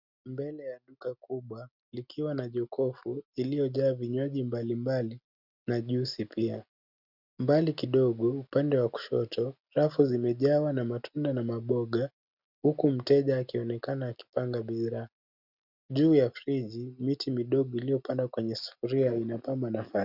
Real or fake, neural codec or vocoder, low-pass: real; none; 5.4 kHz